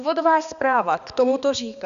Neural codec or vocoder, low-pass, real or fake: codec, 16 kHz, 2 kbps, X-Codec, HuBERT features, trained on balanced general audio; 7.2 kHz; fake